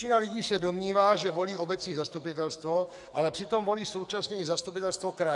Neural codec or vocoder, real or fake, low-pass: codec, 44.1 kHz, 2.6 kbps, SNAC; fake; 10.8 kHz